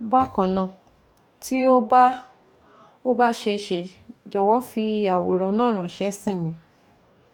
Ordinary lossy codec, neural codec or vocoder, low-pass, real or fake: none; codec, 44.1 kHz, 2.6 kbps, DAC; 19.8 kHz; fake